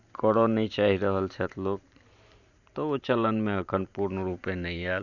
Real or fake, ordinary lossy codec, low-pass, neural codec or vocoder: fake; none; 7.2 kHz; vocoder, 44.1 kHz, 128 mel bands every 512 samples, BigVGAN v2